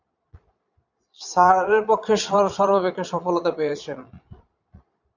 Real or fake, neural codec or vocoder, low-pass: fake; vocoder, 22.05 kHz, 80 mel bands, Vocos; 7.2 kHz